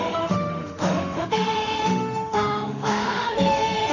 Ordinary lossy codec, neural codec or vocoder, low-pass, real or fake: none; autoencoder, 48 kHz, 32 numbers a frame, DAC-VAE, trained on Japanese speech; 7.2 kHz; fake